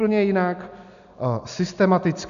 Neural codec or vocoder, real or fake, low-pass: none; real; 7.2 kHz